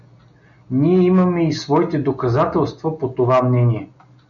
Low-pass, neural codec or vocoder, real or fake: 7.2 kHz; none; real